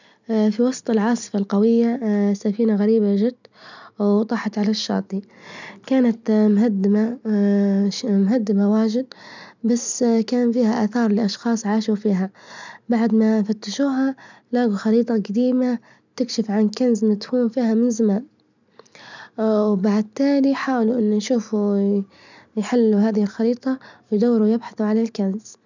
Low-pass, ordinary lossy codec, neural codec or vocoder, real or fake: 7.2 kHz; none; none; real